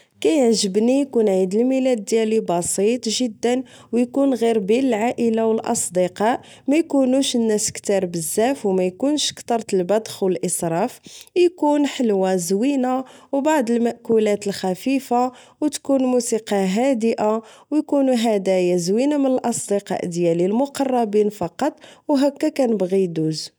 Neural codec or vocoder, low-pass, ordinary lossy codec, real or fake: none; none; none; real